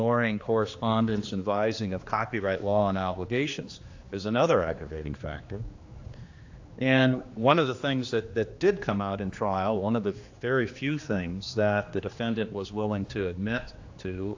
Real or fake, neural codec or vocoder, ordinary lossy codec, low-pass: fake; codec, 16 kHz, 2 kbps, X-Codec, HuBERT features, trained on general audio; AAC, 48 kbps; 7.2 kHz